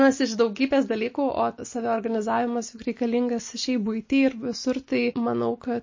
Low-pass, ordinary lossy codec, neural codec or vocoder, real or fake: 7.2 kHz; MP3, 32 kbps; none; real